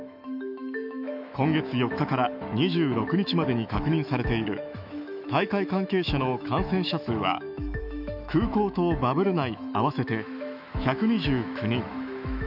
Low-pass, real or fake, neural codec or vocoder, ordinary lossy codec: 5.4 kHz; fake; autoencoder, 48 kHz, 128 numbers a frame, DAC-VAE, trained on Japanese speech; none